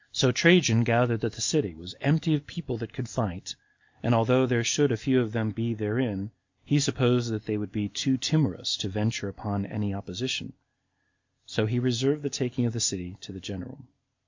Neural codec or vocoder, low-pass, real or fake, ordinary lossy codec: none; 7.2 kHz; real; MP3, 48 kbps